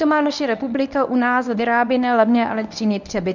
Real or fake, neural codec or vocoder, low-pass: fake; codec, 24 kHz, 0.9 kbps, WavTokenizer, medium speech release version 1; 7.2 kHz